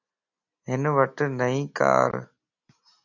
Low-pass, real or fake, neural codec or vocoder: 7.2 kHz; real; none